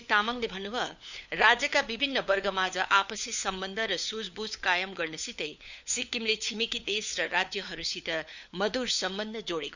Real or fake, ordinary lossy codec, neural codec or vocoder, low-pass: fake; none; codec, 16 kHz, 4 kbps, FunCodec, trained on LibriTTS, 50 frames a second; 7.2 kHz